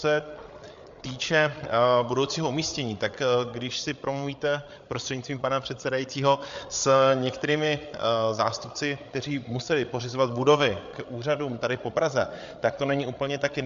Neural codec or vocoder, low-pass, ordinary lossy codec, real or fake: codec, 16 kHz, 8 kbps, FreqCodec, larger model; 7.2 kHz; MP3, 64 kbps; fake